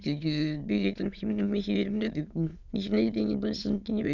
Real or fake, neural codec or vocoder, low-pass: fake; autoencoder, 22.05 kHz, a latent of 192 numbers a frame, VITS, trained on many speakers; 7.2 kHz